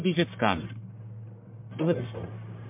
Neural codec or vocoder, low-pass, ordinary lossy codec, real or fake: codec, 44.1 kHz, 1.7 kbps, Pupu-Codec; 3.6 kHz; MP3, 32 kbps; fake